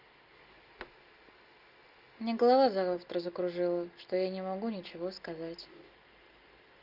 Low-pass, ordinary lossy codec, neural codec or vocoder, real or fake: 5.4 kHz; Opus, 32 kbps; none; real